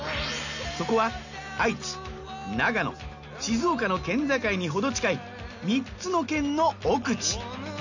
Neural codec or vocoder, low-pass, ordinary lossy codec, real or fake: none; 7.2 kHz; none; real